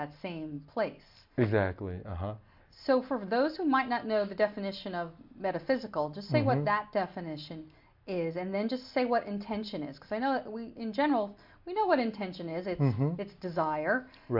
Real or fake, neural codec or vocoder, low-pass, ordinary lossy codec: real; none; 5.4 kHz; MP3, 48 kbps